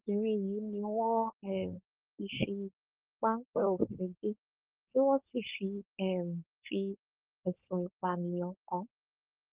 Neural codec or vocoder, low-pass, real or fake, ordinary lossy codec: codec, 16 kHz, 4.8 kbps, FACodec; 3.6 kHz; fake; Opus, 16 kbps